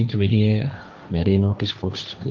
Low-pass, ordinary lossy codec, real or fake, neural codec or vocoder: 7.2 kHz; Opus, 32 kbps; fake; codec, 16 kHz, 1.1 kbps, Voila-Tokenizer